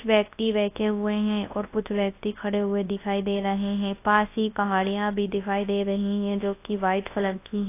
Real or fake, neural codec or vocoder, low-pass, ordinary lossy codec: fake; codec, 24 kHz, 0.9 kbps, WavTokenizer, large speech release; 3.6 kHz; AAC, 24 kbps